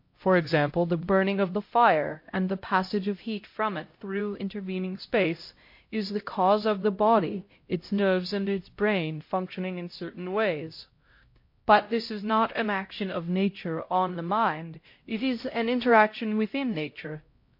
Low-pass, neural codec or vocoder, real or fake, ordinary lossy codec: 5.4 kHz; codec, 16 kHz, 0.5 kbps, X-Codec, HuBERT features, trained on LibriSpeech; fake; MP3, 32 kbps